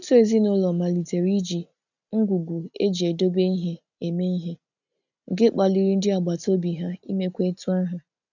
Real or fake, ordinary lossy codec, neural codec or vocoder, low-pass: real; none; none; 7.2 kHz